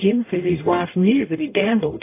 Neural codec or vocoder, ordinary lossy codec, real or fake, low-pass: codec, 44.1 kHz, 0.9 kbps, DAC; AAC, 24 kbps; fake; 3.6 kHz